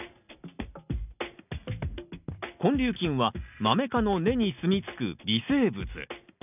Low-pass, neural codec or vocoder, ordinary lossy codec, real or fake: 3.6 kHz; none; none; real